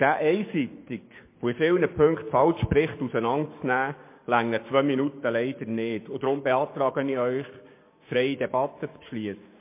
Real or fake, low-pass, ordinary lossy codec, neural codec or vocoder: fake; 3.6 kHz; MP3, 24 kbps; codec, 16 kHz, 6 kbps, DAC